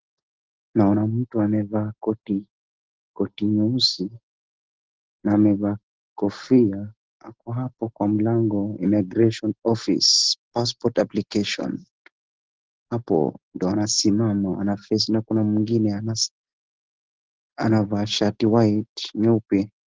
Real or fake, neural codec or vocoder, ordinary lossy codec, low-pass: real; none; Opus, 16 kbps; 7.2 kHz